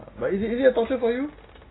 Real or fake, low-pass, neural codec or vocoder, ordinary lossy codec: real; 7.2 kHz; none; AAC, 16 kbps